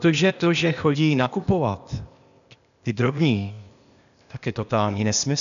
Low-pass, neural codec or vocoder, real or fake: 7.2 kHz; codec, 16 kHz, 0.8 kbps, ZipCodec; fake